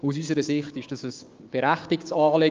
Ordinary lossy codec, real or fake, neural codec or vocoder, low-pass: Opus, 24 kbps; fake; codec, 16 kHz, 6 kbps, DAC; 7.2 kHz